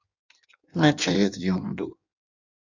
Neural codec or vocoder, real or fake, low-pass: codec, 16 kHz in and 24 kHz out, 1.1 kbps, FireRedTTS-2 codec; fake; 7.2 kHz